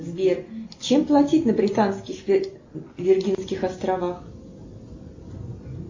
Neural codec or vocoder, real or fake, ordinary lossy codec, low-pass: none; real; MP3, 32 kbps; 7.2 kHz